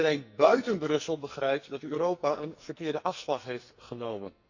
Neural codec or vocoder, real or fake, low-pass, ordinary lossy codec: codec, 44.1 kHz, 2.6 kbps, SNAC; fake; 7.2 kHz; Opus, 64 kbps